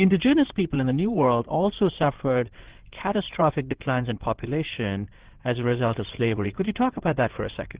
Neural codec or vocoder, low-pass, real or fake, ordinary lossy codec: codec, 16 kHz in and 24 kHz out, 2.2 kbps, FireRedTTS-2 codec; 3.6 kHz; fake; Opus, 16 kbps